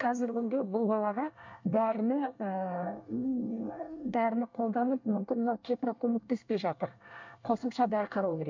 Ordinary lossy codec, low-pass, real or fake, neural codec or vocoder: MP3, 64 kbps; 7.2 kHz; fake; codec, 24 kHz, 1 kbps, SNAC